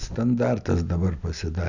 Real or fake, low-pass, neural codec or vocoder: real; 7.2 kHz; none